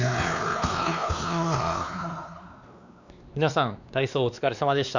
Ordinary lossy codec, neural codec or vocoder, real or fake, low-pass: none; codec, 16 kHz, 2 kbps, X-Codec, WavLM features, trained on Multilingual LibriSpeech; fake; 7.2 kHz